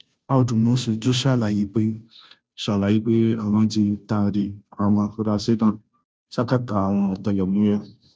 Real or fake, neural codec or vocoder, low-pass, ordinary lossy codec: fake; codec, 16 kHz, 0.5 kbps, FunCodec, trained on Chinese and English, 25 frames a second; none; none